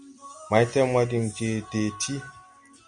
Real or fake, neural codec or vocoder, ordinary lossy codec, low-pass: real; none; Opus, 64 kbps; 9.9 kHz